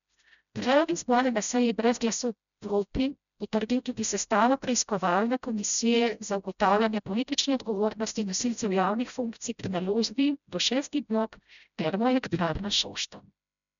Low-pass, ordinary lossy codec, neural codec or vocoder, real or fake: 7.2 kHz; none; codec, 16 kHz, 0.5 kbps, FreqCodec, smaller model; fake